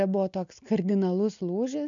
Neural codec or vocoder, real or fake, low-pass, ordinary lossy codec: none; real; 7.2 kHz; MP3, 64 kbps